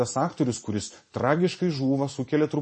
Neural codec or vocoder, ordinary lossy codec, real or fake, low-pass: none; MP3, 32 kbps; real; 9.9 kHz